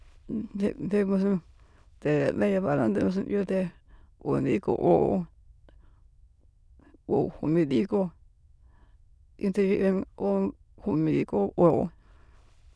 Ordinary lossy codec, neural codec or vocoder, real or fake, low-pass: none; autoencoder, 22.05 kHz, a latent of 192 numbers a frame, VITS, trained on many speakers; fake; none